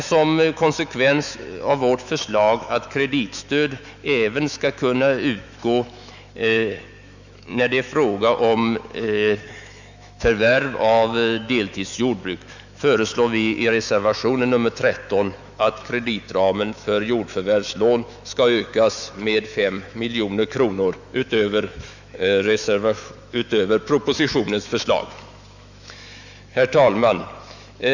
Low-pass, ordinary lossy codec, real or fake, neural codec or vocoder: 7.2 kHz; none; fake; autoencoder, 48 kHz, 128 numbers a frame, DAC-VAE, trained on Japanese speech